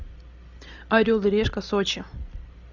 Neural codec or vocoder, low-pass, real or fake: none; 7.2 kHz; real